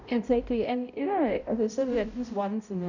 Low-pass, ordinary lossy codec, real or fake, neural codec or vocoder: 7.2 kHz; none; fake; codec, 16 kHz, 0.5 kbps, X-Codec, HuBERT features, trained on balanced general audio